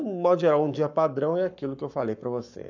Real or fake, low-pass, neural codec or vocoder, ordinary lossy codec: fake; 7.2 kHz; codec, 44.1 kHz, 7.8 kbps, Pupu-Codec; none